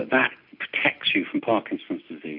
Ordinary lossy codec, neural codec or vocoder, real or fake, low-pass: MP3, 48 kbps; none; real; 5.4 kHz